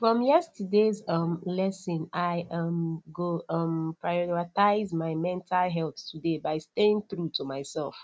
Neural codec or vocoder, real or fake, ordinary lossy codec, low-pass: none; real; none; none